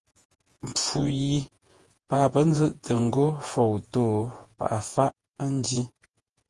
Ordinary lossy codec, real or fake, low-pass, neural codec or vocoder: Opus, 24 kbps; fake; 10.8 kHz; vocoder, 48 kHz, 128 mel bands, Vocos